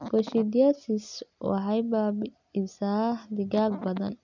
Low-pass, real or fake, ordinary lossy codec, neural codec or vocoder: 7.2 kHz; real; none; none